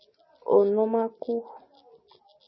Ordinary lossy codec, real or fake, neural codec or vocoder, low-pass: MP3, 24 kbps; real; none; 7.2 kHz